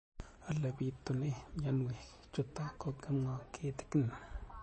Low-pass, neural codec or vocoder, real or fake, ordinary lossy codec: 10.8 kHz; none; real; MP3, 32 kbps